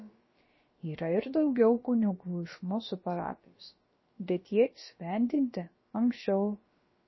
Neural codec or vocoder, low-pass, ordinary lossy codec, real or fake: codec, 16 kHz, about 1 kbps, DyCAST, with the encoder's durations; 7.2 kHz; MP3, 24 kbps; fake